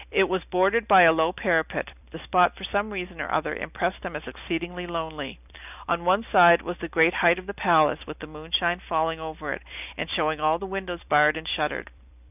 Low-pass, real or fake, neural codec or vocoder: 3.6 kHz; fake; vocoder, 44.1 kHz, 128 mel bands every 256 samples, BigVGAN v2